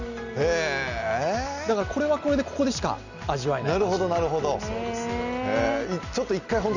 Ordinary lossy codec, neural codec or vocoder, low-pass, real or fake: none; none; 7.2 kHz; real